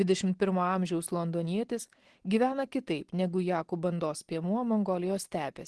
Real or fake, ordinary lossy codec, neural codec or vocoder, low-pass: real; Opus, 16 kbps; none; 10.8 kHz